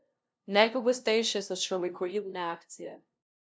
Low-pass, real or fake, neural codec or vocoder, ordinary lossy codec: none; fake; codec, 16 kHz, 0.5 kbps, FunCodec, trained on LibriTTS, 25 frames a second; none